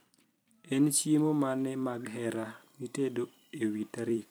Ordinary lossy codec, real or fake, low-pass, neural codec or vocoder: none; fake; none; vocoder, 44.1 kHz, 128 mel bands every 256 samples, BigVGAN v2